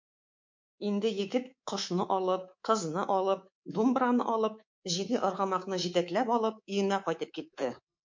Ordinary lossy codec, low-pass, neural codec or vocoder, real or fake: MP3, 48 kbps; 7.2 kHz; codec, 24 kHz, 3.1 kbps, DualCodec; fake